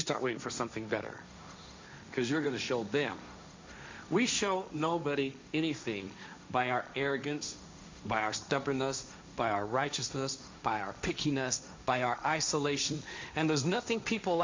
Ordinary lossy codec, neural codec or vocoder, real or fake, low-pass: MP3, 64 kbps; codec, 16 kHz, 1.1 kbps, Voila-Tokenizer; fake; 7.2 kHz